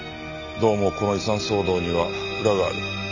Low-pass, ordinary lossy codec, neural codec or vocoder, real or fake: 7.2 kHz; none; none; real